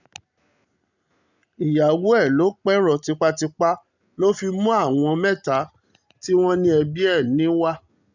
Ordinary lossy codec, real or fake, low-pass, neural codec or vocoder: none; real; 7.2 kHz; none